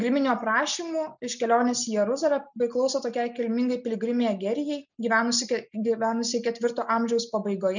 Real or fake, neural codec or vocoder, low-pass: real; none; 7.2 kHz